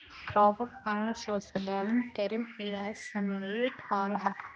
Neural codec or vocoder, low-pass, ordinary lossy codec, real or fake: codec, 16 kHz, 1 kbps, X-Codec, HuBERT features, trained on general audio; none; none; fake